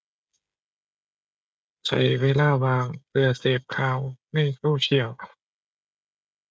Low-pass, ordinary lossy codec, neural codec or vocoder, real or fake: none; none; codec, 16 kHz, 16 kbps, FreqCodec, smaller model; fake